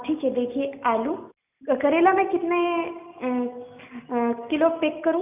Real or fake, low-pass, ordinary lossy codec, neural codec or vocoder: real; 3.6 kHz; none; none